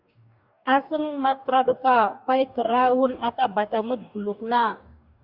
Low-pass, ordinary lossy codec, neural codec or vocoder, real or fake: 5.4 kHz; Opus, 64 kbps; codec, 44.1 kHz, 2.6 kbps, DAC; fake